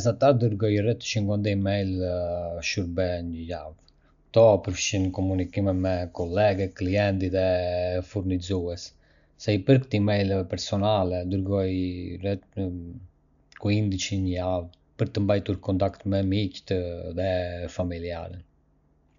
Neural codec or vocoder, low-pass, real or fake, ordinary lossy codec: none; 7.2 kHz; real; none